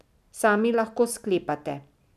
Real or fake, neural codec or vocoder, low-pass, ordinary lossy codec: real; none; 14.4 kHz; none